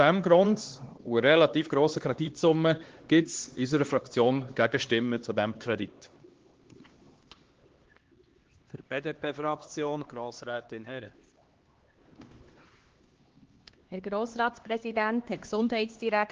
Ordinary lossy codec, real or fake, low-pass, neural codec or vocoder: Opus, 16 kbps; fake; 7.2 kHz; codec, 16 kHz, 2 kbps, X-Codec, HuBERT features, trained on LibriSpeech